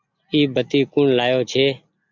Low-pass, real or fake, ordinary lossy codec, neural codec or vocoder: 7.2 kHz; real; MP3, 64 kbps; none